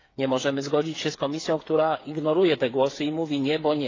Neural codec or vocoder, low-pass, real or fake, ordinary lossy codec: codec, 16 kHz, 8 kbps, FreqCodec, smaller model; 7.2 kHz; fake; AAC, 32 kbps